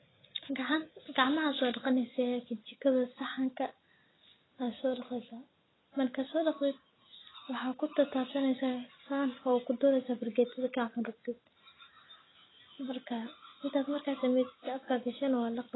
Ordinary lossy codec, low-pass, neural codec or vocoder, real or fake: AAC, 16 kbps; 7.2 kHz; none; real